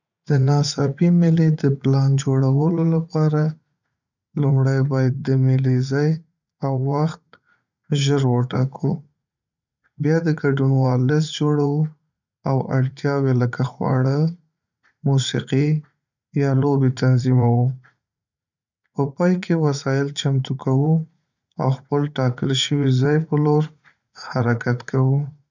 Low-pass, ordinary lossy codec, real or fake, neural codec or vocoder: 7.2 kHz; none; fake; vocoder, 44.1 kHz, 80 mel bands, Vocos